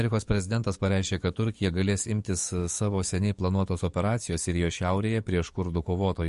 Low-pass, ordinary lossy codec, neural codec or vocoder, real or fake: 14.4 kHz; MP3, 48 kbps; codec, 44.1 kHz, 7.8 kbps, DAC; fake